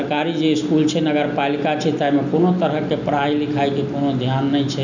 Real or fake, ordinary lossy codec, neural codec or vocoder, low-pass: real; none; none; 7.2 kHz